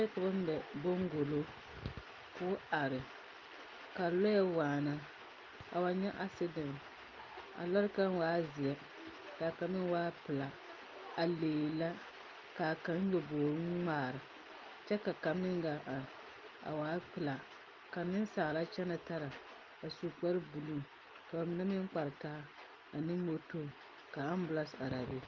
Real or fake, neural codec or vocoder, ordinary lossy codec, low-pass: real; none; Opus, 24 kbps; 7.2 kHz